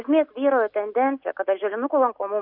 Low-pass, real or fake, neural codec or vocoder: 5.4 kHz; real; none